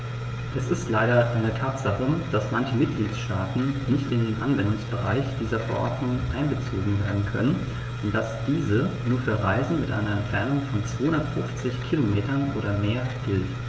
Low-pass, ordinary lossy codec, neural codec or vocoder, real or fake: none; none; codec, 16 kHz, 16 kbps, FreqCodec, smaller model; fake